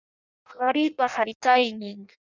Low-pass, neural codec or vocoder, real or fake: 7.2 kHz; codec, 16 kHz in and 24 kHz out, 0.6 kbps, FireRedTTS-2 codec; fake